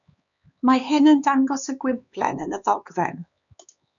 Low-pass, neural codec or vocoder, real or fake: 7.2 kHz; codec, 16 kHz, 4 kbps, X-Codec, HuBERT features, trained on LibriSpeech; fake